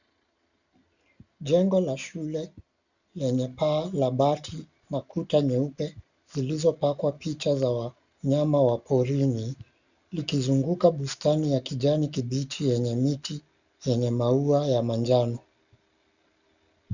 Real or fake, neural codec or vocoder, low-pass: real; none; 7.2 kHz